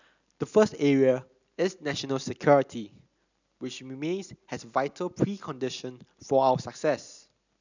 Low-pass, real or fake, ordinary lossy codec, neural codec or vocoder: 7.2 kHz; real; none; none